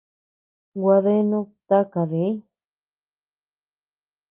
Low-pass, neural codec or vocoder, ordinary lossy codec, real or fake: 3.6 kHz; none; Opus, 24 kbps; real